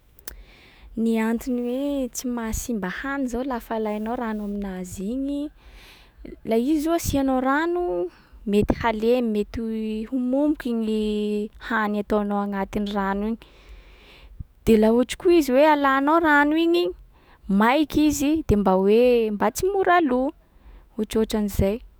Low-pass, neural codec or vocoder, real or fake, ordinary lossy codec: none; autoencoder, 48 kHz, 128 numbers a frame, DAC-VAE, trained on Japanese speech; fake; none